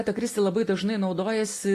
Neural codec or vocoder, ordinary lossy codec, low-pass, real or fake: none; AAC, 48 kbps; 14.4 kHz; real